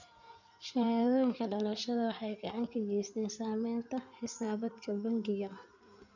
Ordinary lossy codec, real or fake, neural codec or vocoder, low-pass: none; fake; codec, 16 kHz in and 24 kHz out, 2.2 kbps, FireRedTTS-2 codec; 7.2 kHz